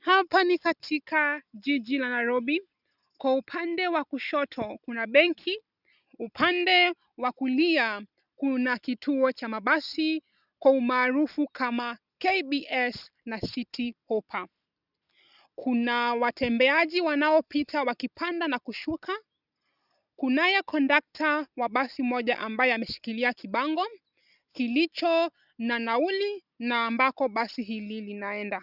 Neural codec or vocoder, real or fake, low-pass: none; real; 5.4 kHz